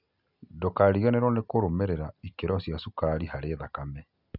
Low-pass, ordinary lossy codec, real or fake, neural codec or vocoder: 5.4 kHz; none; real; none